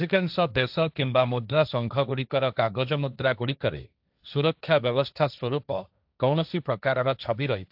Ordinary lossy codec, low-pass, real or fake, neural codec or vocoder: AAC, 48 kbps; 5.4 kHz; fake; codec, 16 kHz, 1.1 kbps, Voila-Tokenizer